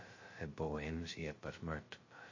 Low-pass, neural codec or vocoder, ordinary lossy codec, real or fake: 7.2 kHz; codec, 16 kHz, 0.2 kbps, FocalCodec; MP3, 32 kbps; fake